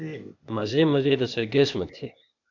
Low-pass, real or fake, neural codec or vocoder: 7.2 kHz; fake; codec, 16 kHz, 0.8 kbps, ZipCodec